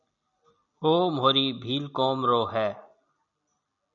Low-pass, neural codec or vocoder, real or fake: 7.2 kHz; none; real